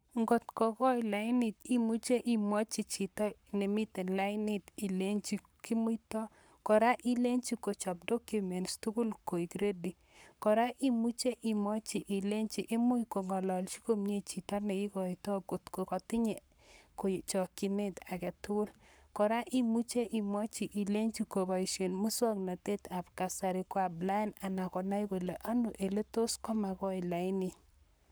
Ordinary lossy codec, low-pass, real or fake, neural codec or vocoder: none; none; fake; codec, 44.1 kHz, 7.8 kbps, Pupu-Codec